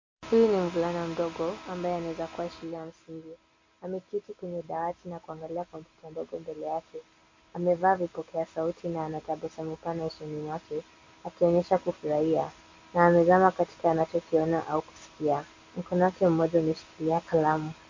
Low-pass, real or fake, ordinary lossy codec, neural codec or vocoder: 7.2 kHz; real; MP3, 48 kbps; none